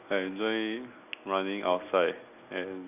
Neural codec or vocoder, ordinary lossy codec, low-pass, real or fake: none; none; 3.6 kHz; real